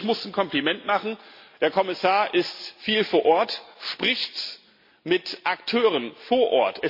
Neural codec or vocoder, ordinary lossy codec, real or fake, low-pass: none; MP3, 32 kbps; real; 5.4 kHz